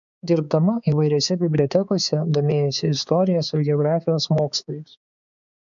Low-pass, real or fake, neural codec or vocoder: 7.2 kHz; fake; codec, 16 kHz, 4 kbps, X-Codec, HuBERT features, trained on balanced general audio